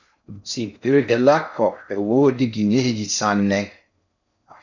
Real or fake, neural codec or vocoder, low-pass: fake; codec, 16 kHz in and 24 kHz out, 0.6 kbps, FocalCodec, streaming, 4096 codes; 7.2 kHz